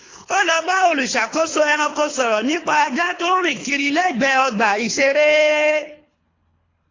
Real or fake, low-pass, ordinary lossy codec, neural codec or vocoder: fake; 7.2 kHz; AAC, 32 kbps; codec, 24 kHz, 3 kbps, HILCodec